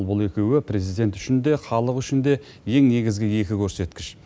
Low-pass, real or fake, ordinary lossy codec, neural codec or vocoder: none; real; none; none